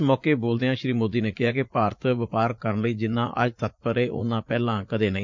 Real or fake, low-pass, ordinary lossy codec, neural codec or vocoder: fake; 7.2 kHz; MP3, 64 kbps; vocoder, 44.1 kHz, 80 mel bands, Vocos